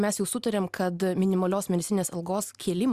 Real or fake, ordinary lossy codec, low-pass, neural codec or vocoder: real; Opus, 64 kbps; 14.4 kHz; none